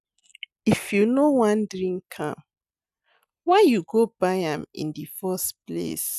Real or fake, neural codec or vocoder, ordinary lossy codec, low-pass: real; none; none; 14.4 kHz